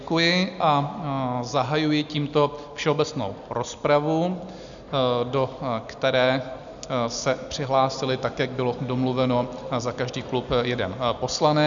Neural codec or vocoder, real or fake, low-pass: none; real; 7.2 kHz